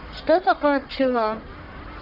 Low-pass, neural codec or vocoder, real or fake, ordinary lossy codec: 5.4 kHz; codec, 44.1 kHz, 1.7 kbps, Pupu-Codec; fake; none